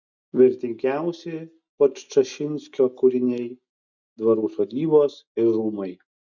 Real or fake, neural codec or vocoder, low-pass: real; none; 7.2 kHz